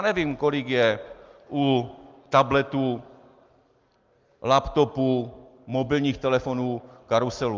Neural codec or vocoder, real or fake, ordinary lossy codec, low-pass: none; real; Opus, 32 kbps; 7.2 kHz